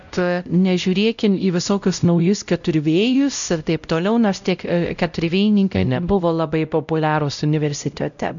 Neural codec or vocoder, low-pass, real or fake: codec, 16 kHz, 0.5 kbps, X-Codec, WavLM features, trained on Multilingual LibriSpeech; 7.2 kHz; fake